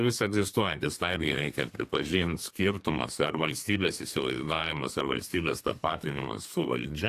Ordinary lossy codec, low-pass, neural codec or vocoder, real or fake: AAC, 64 kbps; 14.4 kHz; codec, 32 kHz, 1.9 kbps, SNAC; fake